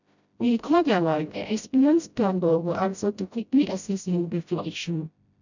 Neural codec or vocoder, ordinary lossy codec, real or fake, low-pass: codec, 16 kHz, 0.5 kbps, FreqCodec, smaller model; none; fake; 7.2 kHz